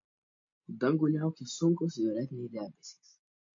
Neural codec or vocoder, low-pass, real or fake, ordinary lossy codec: none; 7.2 kHz; real; MP3, 48 kbps